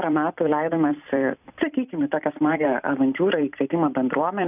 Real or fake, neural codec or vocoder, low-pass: fake; vocoder, 44.1 kHz, 128 mel bands every 256 samples, BigVGAN v2; 3.6 kHz